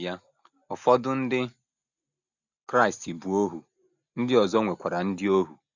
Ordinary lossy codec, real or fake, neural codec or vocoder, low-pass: none; real; none; 7.2 kHz